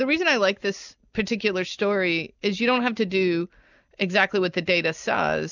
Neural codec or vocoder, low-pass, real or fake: none; 7.2 kHz; real